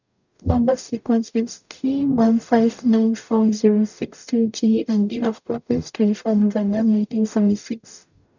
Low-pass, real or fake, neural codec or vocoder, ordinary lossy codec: 7.2 kHz; fake; codec, 44.1 kHz, 0.9 kbps, DAC; none